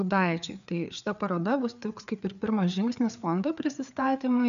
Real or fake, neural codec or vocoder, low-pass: fake; codec, 16 kHz, 4 kbps, FreqCodec, larger model; 7.2 kHz